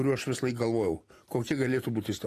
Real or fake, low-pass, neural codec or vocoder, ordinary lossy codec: fake; 14.4 kHz; vocoder, 44.1 kHz, 128 mel bands every 256 samples, BigVGAN v2; AAC, 48 kbps